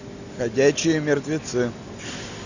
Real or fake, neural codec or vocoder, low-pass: real; none; 7.2 kHz